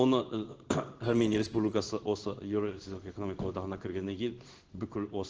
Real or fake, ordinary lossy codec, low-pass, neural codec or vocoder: fake; Opus, 16 kbps; 7.2 kHz; codec, 16 kHz in and 24 kHz out, 1 kbps, XY-Tokenizer